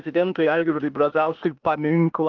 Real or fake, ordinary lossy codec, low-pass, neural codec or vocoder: fake; Opus, 24 kbps; 7.2 kHz; codec, 16 kHz, 0.8 kbps, ZipCodec